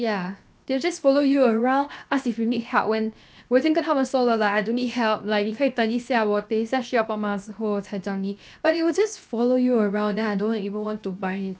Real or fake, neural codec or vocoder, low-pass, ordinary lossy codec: fake; codec, 16 kHz, 0.7 kbps, FocalCodec; none; none